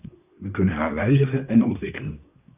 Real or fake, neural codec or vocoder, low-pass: fake; codec, 24 kHz, 1 kbps, SNAC; 3.6 kHz